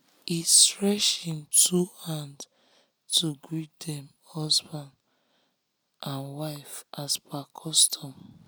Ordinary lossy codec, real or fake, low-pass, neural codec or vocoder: none; real; none; none